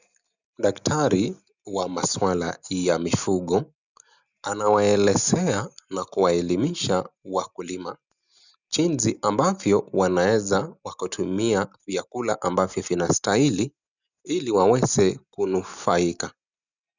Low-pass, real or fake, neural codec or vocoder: 7.2 kHz; real; none